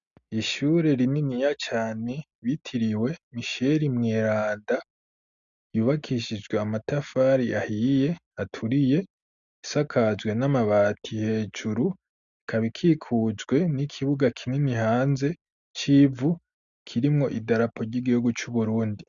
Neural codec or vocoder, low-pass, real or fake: none; 7.2 kHz; real